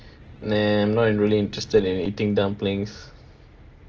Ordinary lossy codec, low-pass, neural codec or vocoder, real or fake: Opus, 16 kbps; 7.2 kHz; none; real